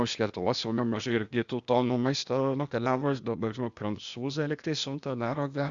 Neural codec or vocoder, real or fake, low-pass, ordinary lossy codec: codec, 16 kHz, 0.8 kbps, ZipCodec; fake; 7.2 kHz; Opus, 64 kbps